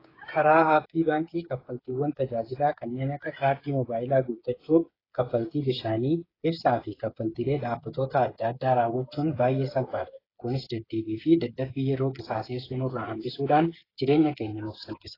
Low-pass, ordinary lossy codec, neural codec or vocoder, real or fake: 5.4 kHz; AAC, 24 kbps; codec, 16 kHz, 8 kbps, FreqCodec, smaller model; fake